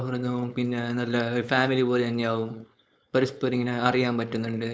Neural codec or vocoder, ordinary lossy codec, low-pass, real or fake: codec, 16 kHz, 4.8 kbps, FACodec; none; none; fake